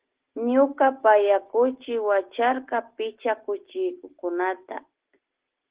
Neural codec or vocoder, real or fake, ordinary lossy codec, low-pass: none; real; Opus, 16 kbps; 3.6 kHz